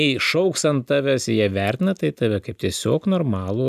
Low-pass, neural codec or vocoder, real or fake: 14.4 kHz; none; real